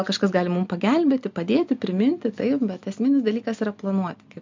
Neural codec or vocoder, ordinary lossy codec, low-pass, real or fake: none; MP3, 64 kbps; 7.2 kHz; real